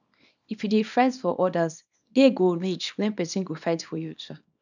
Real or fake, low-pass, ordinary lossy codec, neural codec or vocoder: fake; 7.2 kHz; none; codec, 24 kHz, 0.9 kbps, WavTokenizer, small release